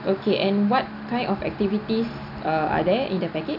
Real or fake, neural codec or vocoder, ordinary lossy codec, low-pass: real; none; none; 5.4 kHz